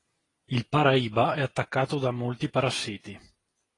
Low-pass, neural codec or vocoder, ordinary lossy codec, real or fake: 10.8 kHz; none; AAC, 32 kbps; real